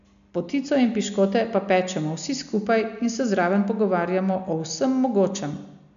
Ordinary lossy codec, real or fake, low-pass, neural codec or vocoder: none; real; 7.2 kHz; none